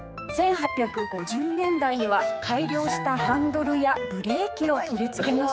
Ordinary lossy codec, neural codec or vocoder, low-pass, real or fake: none; codec, 16 kHz, 4 kbps, X-Codec, HuBERT features, trained on general audio; none; fake